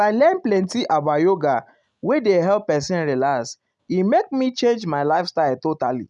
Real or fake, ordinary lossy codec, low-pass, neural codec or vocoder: real; none; 10.8 kHz; none